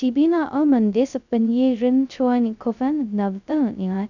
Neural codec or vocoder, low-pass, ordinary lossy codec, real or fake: codec, 16 kHz, 0.2 kbps, FocalCodec; 7.2 kHz; none; fake